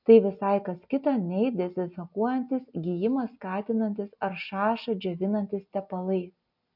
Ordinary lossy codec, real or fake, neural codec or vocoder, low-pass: MP3, 48 kbps; real; none; 5.4 kHz